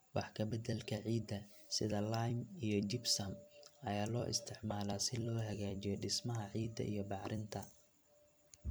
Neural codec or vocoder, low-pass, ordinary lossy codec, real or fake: vocoder, 44.1 kHz, 128 mel bands every 256 samples, BigVGAN v2; none; none; fake